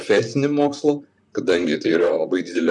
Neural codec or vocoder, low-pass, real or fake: vocoder, 44.1 kHz, 128 mel bands, Pupu-Vocoder; 10.8 kHz; fake